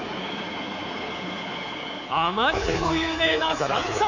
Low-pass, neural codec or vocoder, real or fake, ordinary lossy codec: 7.2 kHz; codec, 24 kHz, 3.1 kbps, DualCodec; fake; none